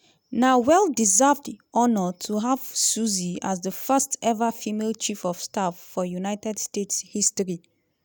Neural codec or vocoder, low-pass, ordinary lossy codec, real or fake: none; none; none; real